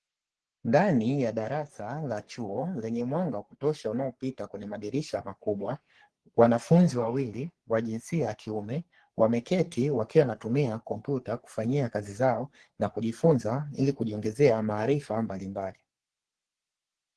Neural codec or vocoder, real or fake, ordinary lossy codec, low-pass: codec, 44.1 kHz, 3.4 kbps, Pupu-Codec; fake; Opus, 16 kbps; 10.8 kHz